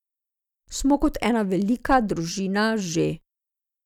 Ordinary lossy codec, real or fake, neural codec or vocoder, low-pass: none; real; none; 19.8 kHz